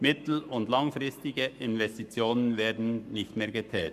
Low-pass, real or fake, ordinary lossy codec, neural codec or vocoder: 14.4 kHz; fake; none; codec, 44.1 kHz, 7.8 kbps, Pupu-Codec